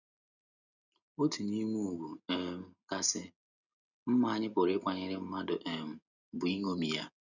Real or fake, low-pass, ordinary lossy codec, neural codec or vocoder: real; 7.2 kHz; none; none